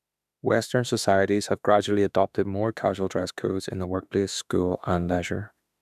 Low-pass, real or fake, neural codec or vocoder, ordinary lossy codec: 14.4 kHz; fake; autoencoder, 48 kHz, 32 numbers a frame, DAC-VAE, trained on Japanese speech; none